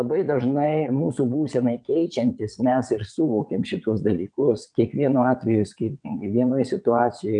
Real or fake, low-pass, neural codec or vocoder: fake; 9.9 kHz; vocoder, 22.05 kHz, 80 mel bands, WaveNeXt